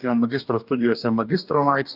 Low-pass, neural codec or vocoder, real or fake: 5.4 kHz; codec, 44.1 kHz, 2.6 kbps, DAC; fake